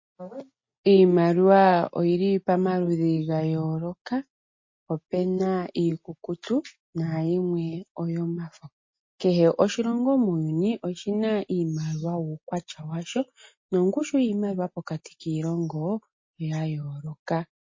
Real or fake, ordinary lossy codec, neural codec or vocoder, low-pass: real; MP3, 32 kbps; none; 7.2 kHz